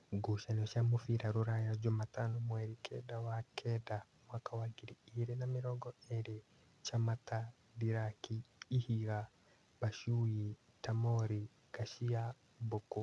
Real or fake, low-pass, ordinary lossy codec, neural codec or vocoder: real; none; none; none